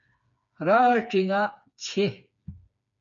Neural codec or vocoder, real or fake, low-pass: codec, 16 kHz, 4 kbps, FreqCodec, smaller model; fake; 7.2 kHz